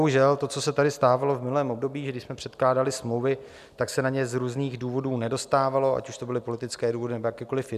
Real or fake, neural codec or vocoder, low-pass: real; none; 14.4 kHz